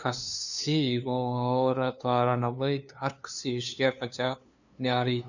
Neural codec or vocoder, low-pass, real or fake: codec, 16 kHz, 2 kbps, FunCodec, trained on LibriTTS, 25 frames a second; 7.2 kHz; fake